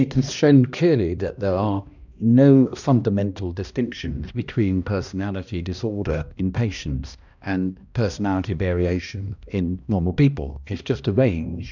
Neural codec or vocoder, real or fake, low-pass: codec, 16 kHz, 1 kbps, X-Codec, HuBERT features, trained on balanced general audio; fake; 7.2 kHz